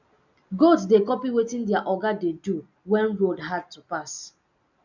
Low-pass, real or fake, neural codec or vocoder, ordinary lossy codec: 7.2 kHz; real; none; none